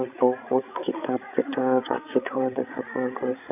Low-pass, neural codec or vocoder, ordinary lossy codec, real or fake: 3.6 kHz; codec, 16 kHz, 16 kbps, FreqCodec, larger model; none; fake